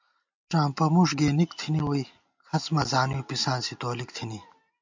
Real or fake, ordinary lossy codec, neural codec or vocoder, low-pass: real; MP3, 64 kbps; none; 7.2 kHz